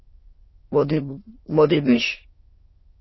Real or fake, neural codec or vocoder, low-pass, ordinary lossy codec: fake; autoencoder, 22.05 kHz, a latent of 192 numbers a frame, VITS, trained on many speakers; 7.2 kHz; MP3, 24 kbps